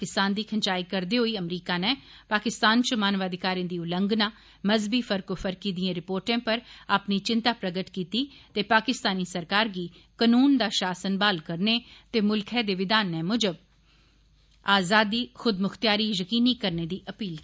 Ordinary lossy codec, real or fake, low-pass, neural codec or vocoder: none; real; none; none